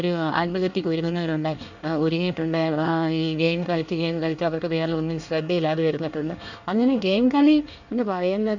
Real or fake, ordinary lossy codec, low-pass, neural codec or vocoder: fake; none; 7.2 kHz; codec, 24 kHz, 1 kbps, SNAC